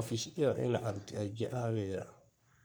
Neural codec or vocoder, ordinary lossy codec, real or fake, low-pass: codec, 44.1 kHz, 3.4 kbps, Pupu-Codec; none; fake; none